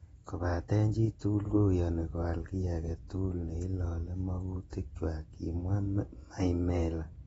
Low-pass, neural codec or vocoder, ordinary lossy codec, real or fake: 19.8 kHz; vocoder, 48 kHz, 128 mel bands, Vocos; AAC, 24 kbps; fake